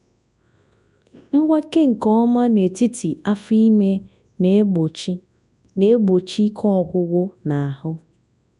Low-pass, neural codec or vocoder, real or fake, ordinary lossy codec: 10.8 kHz; codec, 24 kHz, 0.9 kbps, WavTokenizer, large speech release; fake; none